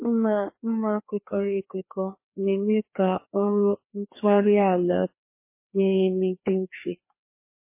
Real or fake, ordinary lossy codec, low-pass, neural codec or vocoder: fake; MP3, 24 kbps; 3.6 kHz; codec, 16 kHz, 2 kbps, FreqCodec, larger model